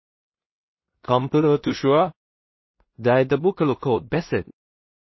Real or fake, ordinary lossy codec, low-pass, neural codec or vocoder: fake; MP3, 24 kbps; 7.2 kHz; codec, 16 kHz in and 24 kHz out, 0.4 kbps, LongCat-Audio-Codec, two codebook decoder